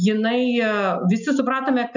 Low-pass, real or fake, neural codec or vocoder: 7.2 kHz; real; none